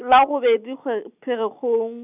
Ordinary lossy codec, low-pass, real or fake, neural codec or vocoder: none; 3.6 kHz; real; none